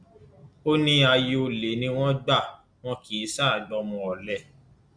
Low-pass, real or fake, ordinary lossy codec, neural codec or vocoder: 9.9 kHz; real; Opus, 64 kbps; none